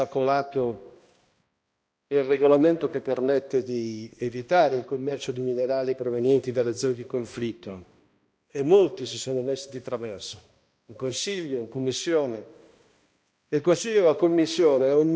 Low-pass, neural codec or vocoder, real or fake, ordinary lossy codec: none; codec, 16 kHz, 1 kbps, X-Codec, HuBERT features, trained on balanced general audio; fake; none